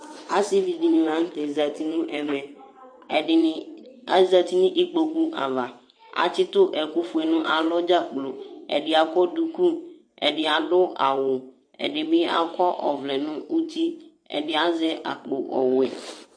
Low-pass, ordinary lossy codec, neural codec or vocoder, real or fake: 9.9 kHz; MP3, 48 kbps; vocoder, 22.05 kHz, 80 mel bands, WaveNeXt; fake